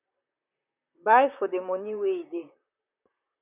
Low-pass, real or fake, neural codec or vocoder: 3.6 kHz; fake; vocoder, 44.1 kHz, 128 mel bands, Pupu-Vocoder